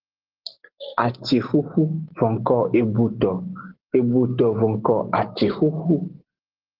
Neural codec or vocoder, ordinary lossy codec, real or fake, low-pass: none; Opus, 16 kbps; real; 5.4 kHz